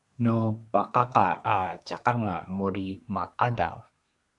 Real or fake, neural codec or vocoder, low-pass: fake; codec, 24 kHz, 1 kbps, SNAC; 10.8 kHz